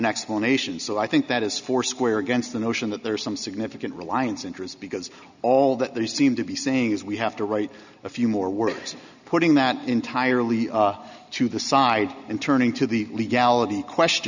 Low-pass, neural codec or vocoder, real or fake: 7.2 kHz; none; real